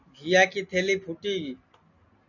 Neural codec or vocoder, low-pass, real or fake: none; 7.2 kHz; real